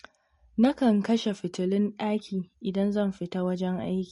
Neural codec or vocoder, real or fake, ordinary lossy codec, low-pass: none; real; MP3, 48 kbps; 10.8 kHz